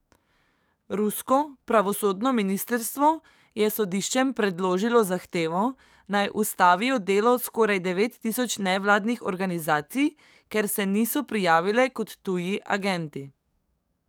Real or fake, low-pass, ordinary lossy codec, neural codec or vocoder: fake; none; none; codec, 44.1 kHz, 7.8 kbps, DAC